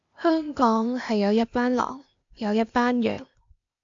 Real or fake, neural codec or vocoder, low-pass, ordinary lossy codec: fake; codec, 16 kHz, 0.8 kbps, ZipCodec; 7.2 kHz; AAC, 64 kbps